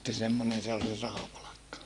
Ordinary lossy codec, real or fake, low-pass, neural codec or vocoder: none; fake; none; vocoder, 24 kHz, 100 mel bands, Vocos